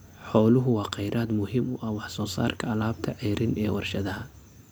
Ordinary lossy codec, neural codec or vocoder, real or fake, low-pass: none; none; real; none